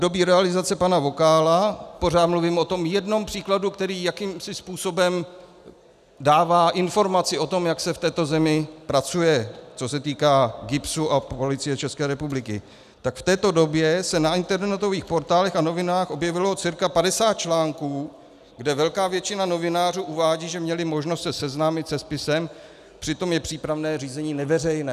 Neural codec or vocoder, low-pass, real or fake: none; 14.4 kHz; real